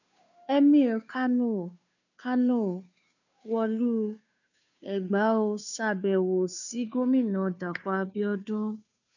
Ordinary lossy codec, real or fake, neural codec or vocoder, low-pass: none; fake; codec, 16 kHz, 2 kbps, FunCodec, trained on Chinese and English, 25 frames a second; 7.2 kHz